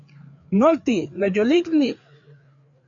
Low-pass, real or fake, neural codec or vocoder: 7.2 kHz; fake; codec, 16 kHz, 4 kbps, FreqCodec, larger model